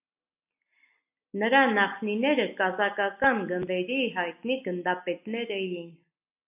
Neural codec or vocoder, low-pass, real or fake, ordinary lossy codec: none; 3.6 kHz; real; AAC, 32 kbps